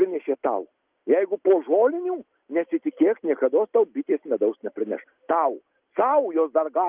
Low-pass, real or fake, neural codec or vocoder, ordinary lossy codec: 3.6 kHz; real; none; Opus, 24 kbps